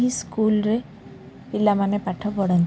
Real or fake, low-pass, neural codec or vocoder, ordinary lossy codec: real; none; none; none